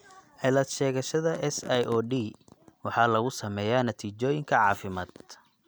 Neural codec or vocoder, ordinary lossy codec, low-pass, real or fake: none; none; none; real